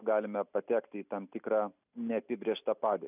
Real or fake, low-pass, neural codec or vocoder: real; 3.6 kHz; none